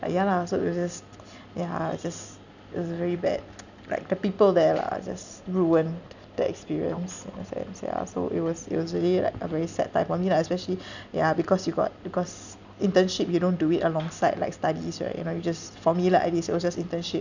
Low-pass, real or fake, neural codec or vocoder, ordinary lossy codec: 7.2 kHz; real; none; none